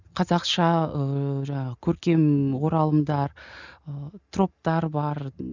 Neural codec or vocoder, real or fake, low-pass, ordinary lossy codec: none; real; 7.2 kHz; none